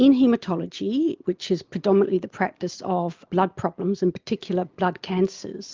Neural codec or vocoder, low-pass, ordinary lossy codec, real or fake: none; 7.2 kHz; Opus, 32 kbps; real